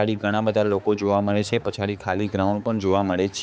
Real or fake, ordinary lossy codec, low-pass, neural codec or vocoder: fake; none; none; codec, 16 kHz, 4 kbps, X-Codec, HuBERT features, trained on balanced general audio